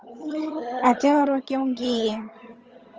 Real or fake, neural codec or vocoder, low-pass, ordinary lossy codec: fake; vocoder, 22.05 kHz, 80 mel bands, HiFi-GAN; 7.2 kHz; Opus, 24 kbps